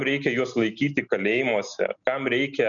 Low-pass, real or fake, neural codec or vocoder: 7.2 kHz; real; none